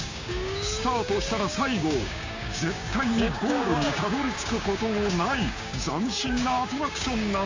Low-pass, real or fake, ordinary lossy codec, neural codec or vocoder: 7.2 kHz; fake; AAC, 48 kbps; codec, 16 kHz, 6 kbps, DAC